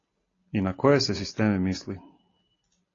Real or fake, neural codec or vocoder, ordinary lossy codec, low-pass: real; none; AAC, 32 kbps; 7.2 kHz